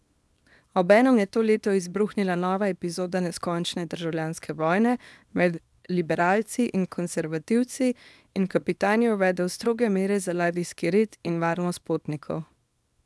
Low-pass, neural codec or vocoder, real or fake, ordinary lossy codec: none; codec, 24 kHz, 0.9 kbps, WavTokenizer, small release; fake; none